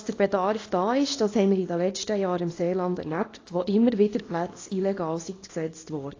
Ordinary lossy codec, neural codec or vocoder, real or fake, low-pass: AAC, 32 kbps; codec, 24 kHz, 0.9 kbps, WavTokenizer, small release; fake; 7.2 kHz